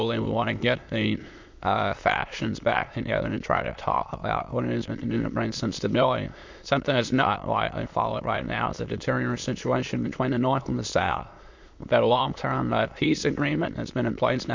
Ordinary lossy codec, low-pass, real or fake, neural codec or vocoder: MP3, 48 kbps; 7.2 kHz; fake; autoencoder, 22.05 kHz, a latent of 192 numbers a frame, VITS, trained on many speakers